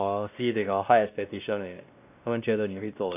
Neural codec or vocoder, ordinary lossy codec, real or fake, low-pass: codec, 16 kHz, 1 kbps, X-Codec, WavLM features, trained on Multilingual LibriSpeech; none; fake; 3.6 kHz